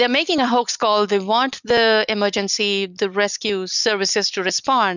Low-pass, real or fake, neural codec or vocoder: 7.2 kHz; real; none